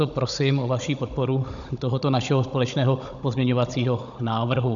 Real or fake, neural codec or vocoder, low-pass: fake; codec, 16 kHz, 16 kbps, FunCodec, trained on Chinese and English, 50 frames a second; 7.2 kHz